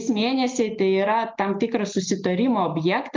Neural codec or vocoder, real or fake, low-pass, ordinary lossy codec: none; real; 7.2 kHz; Opus, 32 kbps